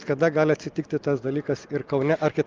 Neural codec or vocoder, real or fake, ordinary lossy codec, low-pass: none; real; Opus, 32 kbps; 7.2 kHz